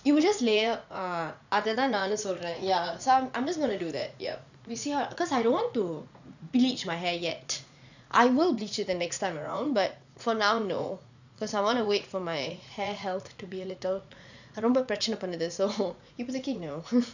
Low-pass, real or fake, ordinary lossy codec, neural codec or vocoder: 7.2 kHz; fake; none; vocoder, 44.1 kHz, 80 mel bands, Vocos